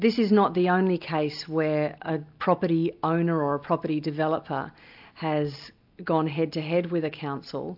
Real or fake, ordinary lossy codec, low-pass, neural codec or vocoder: real; AAC, 48 kbps; 5.4 kHz; none